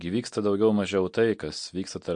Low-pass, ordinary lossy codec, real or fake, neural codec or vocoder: 9.9 kHz; MP3, 48 kbps; real; none